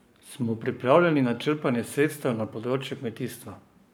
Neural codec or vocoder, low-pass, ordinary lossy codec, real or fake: codec, 44.1 kHz, 7.8 kbps, Pupu-Codec; none; none; fake